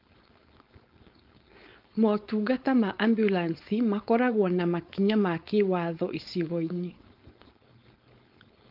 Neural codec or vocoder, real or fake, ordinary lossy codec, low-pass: codec, 16 kHz, 4.8 kbps, FACodec; fake; Opus, 32 kbps; 5.4 kHz